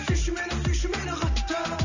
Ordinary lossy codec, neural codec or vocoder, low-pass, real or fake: none; none; 7.2 kHz; real